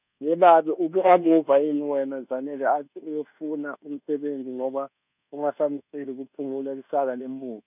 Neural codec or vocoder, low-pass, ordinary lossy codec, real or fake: codec, 24 kHz, 1.2 kbps, DualCodec; 3.6 kHz; none; fake